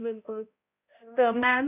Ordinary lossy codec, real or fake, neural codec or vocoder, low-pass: AAC, 32 kbps; fake; codec, 16 kHz, 0.5 kbps, X-Codec, HuBERT features, trained on balanced general audio; 3.6 kHz